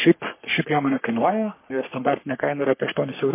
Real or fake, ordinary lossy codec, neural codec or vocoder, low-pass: fake; MP3, 24 kbps; codec, 44.1 kHz, 3.4 kbps, Pupu-Codec; 3.6 kHz